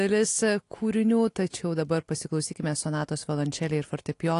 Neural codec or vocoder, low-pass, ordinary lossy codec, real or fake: none; 10.8 kHz; AAC, 48 kbps; real